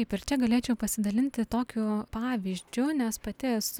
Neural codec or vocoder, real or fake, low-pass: none; real; 19.8 kHz